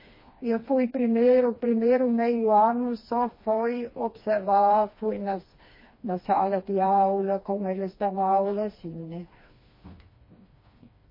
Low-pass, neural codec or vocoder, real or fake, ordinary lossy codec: 5.4 kHz; codec, 16 kHz, 2 kbps, FreqCodec, smaller model; fake; MP3, 24 kbps